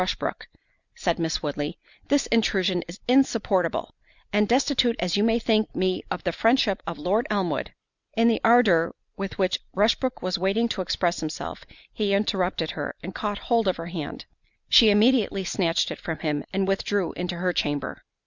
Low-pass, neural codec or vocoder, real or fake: 7.2 kHz; none; real